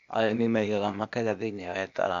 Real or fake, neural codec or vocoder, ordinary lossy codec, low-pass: fake; codec, 16 kHz, 0.8 kbps, ZipCodec; AAC, 96 kbps; 7.2 kHz